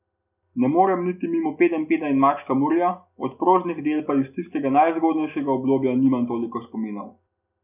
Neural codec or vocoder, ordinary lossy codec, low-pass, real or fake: none; none; 3.6 kHz; real